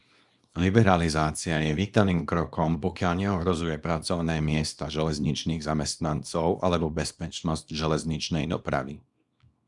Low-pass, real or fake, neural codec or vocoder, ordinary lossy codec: 10.8 kHz; fake; codec, 24 kHz, 0.9 kbps, WavTokenizer, small release; Opus, 64 kbps